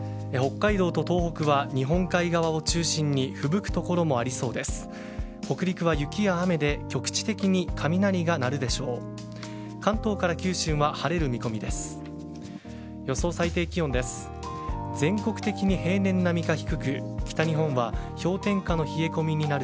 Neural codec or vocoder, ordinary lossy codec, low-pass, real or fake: none; none; none; real